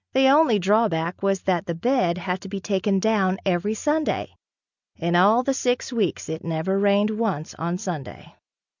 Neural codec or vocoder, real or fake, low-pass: none; real; 7.2 kHz